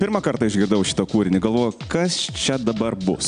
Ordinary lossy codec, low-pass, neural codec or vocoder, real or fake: AAC, 96 kbps; 9.9 kHz; none; real